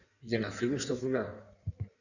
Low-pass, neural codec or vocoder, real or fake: 7.2 kHz; codec, 16 kHz in and 24 kHz out, 1.1 kbps, FireRedTTS-2 codec; fake